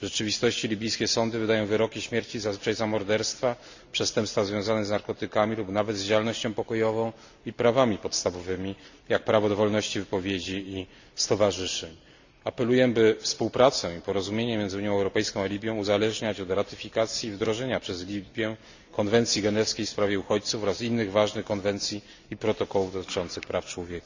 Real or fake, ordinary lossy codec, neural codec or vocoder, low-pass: real; Opus, 64 kbps; none; 7.2 kHz